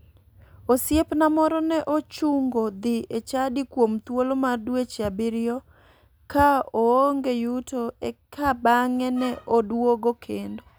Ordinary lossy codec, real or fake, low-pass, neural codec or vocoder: none; real; none; none